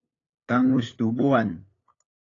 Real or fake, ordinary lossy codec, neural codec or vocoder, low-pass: fake; AAC, 32 kbps; codec, 16 kHz, 8 kbps, FunCodec, trained on LibriTTS, 25 frames a second; 7.2 kHz